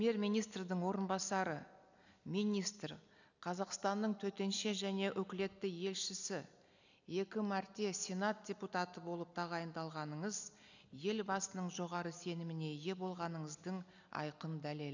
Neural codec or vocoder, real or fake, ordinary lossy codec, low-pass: none; real; none; 7.2 kHz